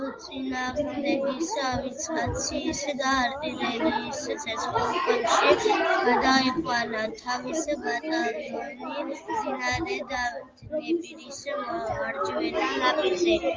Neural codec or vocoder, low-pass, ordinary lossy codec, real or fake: none; 7.2 kHz; Opus, 32 kbps; real